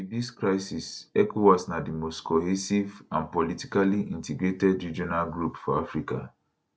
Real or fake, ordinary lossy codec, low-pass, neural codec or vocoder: real; none; none; none